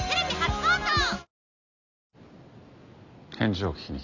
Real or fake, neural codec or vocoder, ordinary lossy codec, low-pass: real; none; none; 7.2 kHz